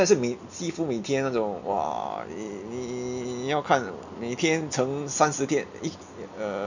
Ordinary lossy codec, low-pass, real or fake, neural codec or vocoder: none; 7.2 kHz; real; none